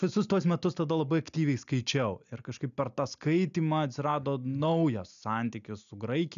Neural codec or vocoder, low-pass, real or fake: none; 7.2 kHz; real